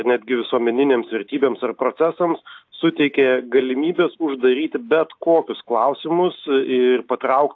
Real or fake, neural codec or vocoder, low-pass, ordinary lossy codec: real; none; 7.2 kHz; AAC, 48 kbps